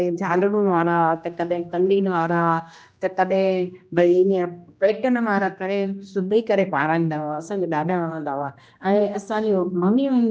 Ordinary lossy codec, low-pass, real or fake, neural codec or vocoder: none; none; fake; codec, 16 kHz, 1 kbps, X-Codec, HuBERT features, trained on general audio